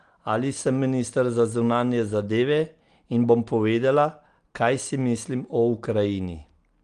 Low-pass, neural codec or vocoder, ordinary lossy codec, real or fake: 9.9 kHz; none; Opus, 24 kbps; real